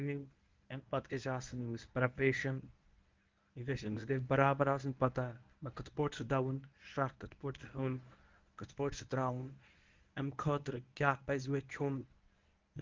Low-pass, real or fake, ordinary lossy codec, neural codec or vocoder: 7.2 kHz; fake; Opus, 24 kbps; codec, 24 kHz, 0.9 kbps, WavTokenizer, medium speech release version 1